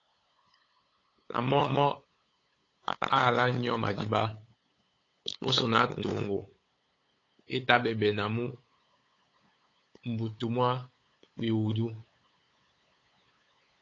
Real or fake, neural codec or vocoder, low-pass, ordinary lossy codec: fake; codec, 16 kHz, 8 kbps, FunCodec, trained on LibriTTS, 25 frames a second; 7.2 kHz; AAC, 32 kbps